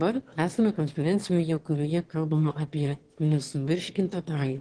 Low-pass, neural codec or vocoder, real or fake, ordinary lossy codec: 9.9 kHz; autoencoder, 22.05 kHz, a latent of 192 numbers a frame, VITS, trained on one speaker; fake; Opus, 16 kbps